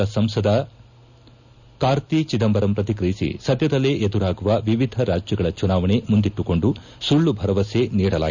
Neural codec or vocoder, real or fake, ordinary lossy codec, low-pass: none; real; none; 7.2 kHz